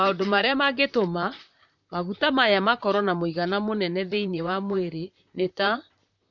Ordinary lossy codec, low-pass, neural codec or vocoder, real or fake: none; 7.2 kHz; vocoder, 44.1 kHz, 128 mel bands, Pupu-Vocoder; fake